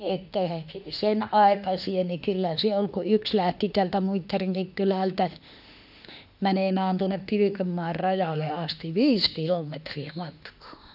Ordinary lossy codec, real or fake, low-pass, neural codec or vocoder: none; fake; 5.4 kHz; autoencoder, 48 kHz, 32 numbers a frame, DAC-VAE, trained on Japanese speech